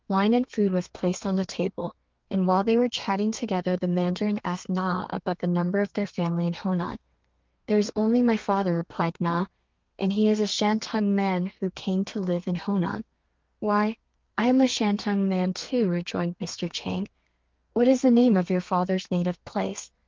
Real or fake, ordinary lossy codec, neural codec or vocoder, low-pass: fake; Opus, 32 kbps; codec, 32 kHz, 1.9 kbps, SNAC; 7.2 kHz